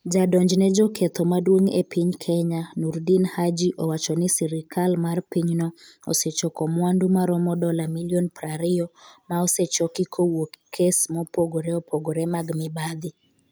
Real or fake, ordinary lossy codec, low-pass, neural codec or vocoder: real; none; none; none